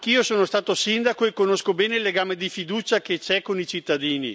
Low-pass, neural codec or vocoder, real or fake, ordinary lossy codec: none; none; real; none